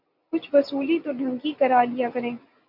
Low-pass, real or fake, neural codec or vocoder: 5.4 kHz; real; none